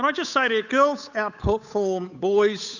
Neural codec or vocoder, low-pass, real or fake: codec, 16 kHz, 8 kbps, FunCodec, trained on Chinese and English, 25 frames a second; 7.2 kHz; fake